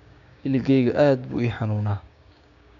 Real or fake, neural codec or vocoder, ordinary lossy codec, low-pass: fake; codec, 16 kHz, 6 kbps, DAC; none; 7.2 kHz